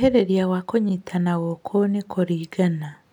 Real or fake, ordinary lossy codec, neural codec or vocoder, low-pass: real; none; none; 19.8 kHz